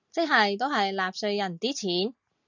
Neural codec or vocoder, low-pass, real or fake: none; 7.2 kHz; real